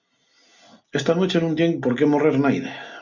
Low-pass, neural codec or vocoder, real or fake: 7.2 kHz; none; real